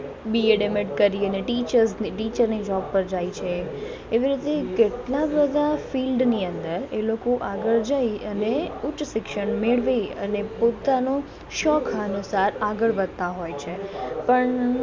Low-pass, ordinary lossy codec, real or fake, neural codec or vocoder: none; none; real; none